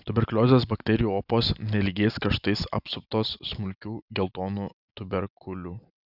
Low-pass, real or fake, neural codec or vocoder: 5.4 kHz; real; none